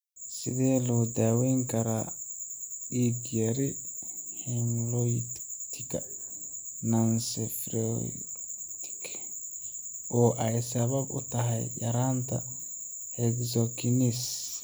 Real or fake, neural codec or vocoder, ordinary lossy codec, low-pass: real; none; none; none